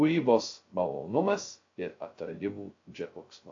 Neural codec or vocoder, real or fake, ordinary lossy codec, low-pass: codec, 16 kHz, 0.2 kbps, FocalCodec; fake; AAC, 48 kbps; 7.2 kHz